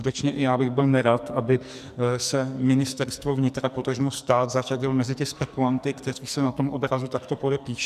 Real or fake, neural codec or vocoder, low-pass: fake; codec, 44.1 kHz, 2.6 kbps, SNAC; 14.4 kHz